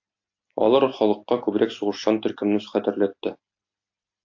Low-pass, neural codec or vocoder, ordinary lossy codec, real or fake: 7.2 kHz; none; AAC, 48 kbps; real